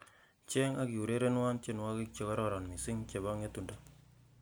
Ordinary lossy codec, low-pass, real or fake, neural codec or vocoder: none; none; real; none